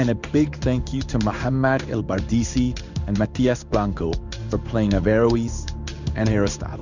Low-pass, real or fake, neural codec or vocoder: 7.2 kHz; fake; codec, 16 kHz in and 24 kHz out, 1 kbps, XY-Tokenizer